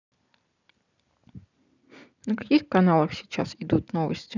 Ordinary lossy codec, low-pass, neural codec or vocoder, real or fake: none; 7.2 kHz; none; real